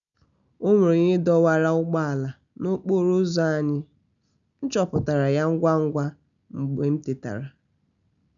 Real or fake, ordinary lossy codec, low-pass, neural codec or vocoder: real; none; 7.2 kHz; none